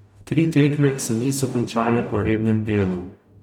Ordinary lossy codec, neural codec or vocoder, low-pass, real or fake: none; codec, 44.1 kHz, 0.9 kbps, DAC; 19.8 kHz; fake